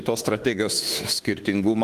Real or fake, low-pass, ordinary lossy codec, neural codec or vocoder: fake; 14.4 kHz; Opus, 24 kbps; autoencoder, 48 kHz, 32 numbers a frame, DAC-VAE, trained on Japanese speech